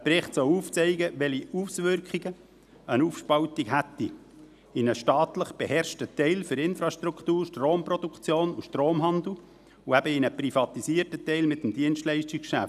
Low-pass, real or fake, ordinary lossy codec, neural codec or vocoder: 14.4 kHz; real; none; none